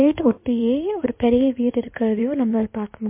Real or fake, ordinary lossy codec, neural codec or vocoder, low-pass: fake; MP3, 24 kbps; codec, 16 kHz in and 24 kHz out, 2.2 kbps, FireRedTTS-2 codec; 3.6 kHz